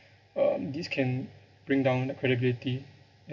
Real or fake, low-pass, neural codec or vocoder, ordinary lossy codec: real; 7.2 kHz; none; none